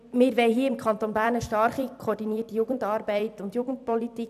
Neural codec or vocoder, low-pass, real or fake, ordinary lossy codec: vocoder, 44.1 kHz, 128 mel bands every 256 samples, BigVGAN v2; 14.4 kHz; fake; none